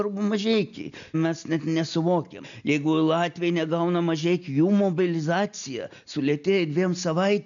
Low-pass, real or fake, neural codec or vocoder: 7.2 kHz; real; none